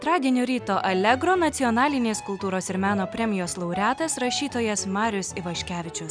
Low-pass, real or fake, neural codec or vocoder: 9.9 kHz; real; none